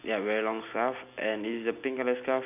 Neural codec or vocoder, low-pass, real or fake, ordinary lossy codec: none; 3.6 kHz; real; none